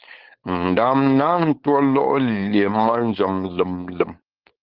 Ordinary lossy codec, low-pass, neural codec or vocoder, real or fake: Opus, 16 kbps; 5.4 kHz; codec, 16 kHz, 4.8 kbps, FACodec; fake